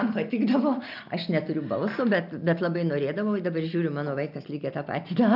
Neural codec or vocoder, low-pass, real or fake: none; 5.4 kHz; real